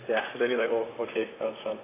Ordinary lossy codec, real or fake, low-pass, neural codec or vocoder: AAC, 16 kbps; fake; 3.6 kHz; vocoder, 44.1 kHz, 128 mel bands, Pupu-Vocoder